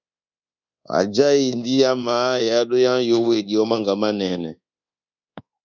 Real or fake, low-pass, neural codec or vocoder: fake; 7.2 kHz; codec, 24 kHz, 1.2 kbps, DualCodec